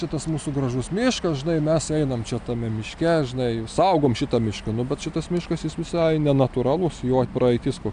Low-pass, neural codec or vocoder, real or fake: 9.9 kHz; none; real